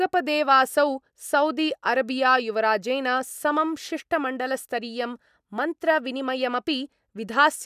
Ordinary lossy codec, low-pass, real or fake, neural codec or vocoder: none; 14.4 kHz; real; none